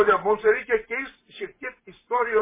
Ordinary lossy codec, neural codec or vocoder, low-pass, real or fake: MP3, 16 kbps; vocoder, 44.1 kHz, 128 mel bands every 512 samples, BigVGAN v2; 3.6 kHz; fake